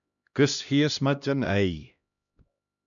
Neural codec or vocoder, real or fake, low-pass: codec, 16 kHz, 1 kbps, X-Codec, HuBERT features, trained on LibriSpeech; fake; 7.2 kHz